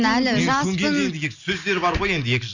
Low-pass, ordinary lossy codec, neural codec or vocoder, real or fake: 7.2 kHz; none; none; real